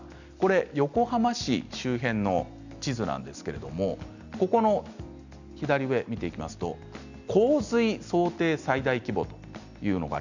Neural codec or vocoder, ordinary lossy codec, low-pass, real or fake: none; none; 7.2 kHz; real